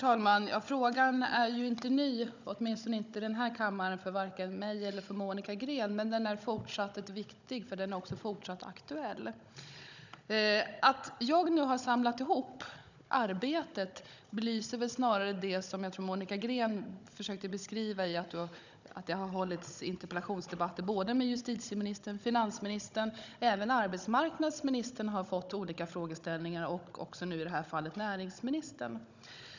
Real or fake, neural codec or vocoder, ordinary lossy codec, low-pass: fake; codec, 16 kHz, 16 kbps, FunCodec, trained on Chinese and English, 50 frames a second; none; 7.2 kHz